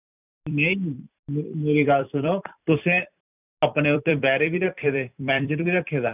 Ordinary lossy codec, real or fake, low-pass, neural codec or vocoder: none; fake; 3.6 kHz; vocoder, 44.1 kHz, 128 mel bands every 256 samples, BigVGAN v2